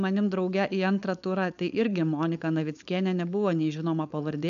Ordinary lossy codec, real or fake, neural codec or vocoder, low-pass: AAC, 96 kbps; fake; codec, 16 kHz, 4.8 kbps, FACodec; 7.2 kHz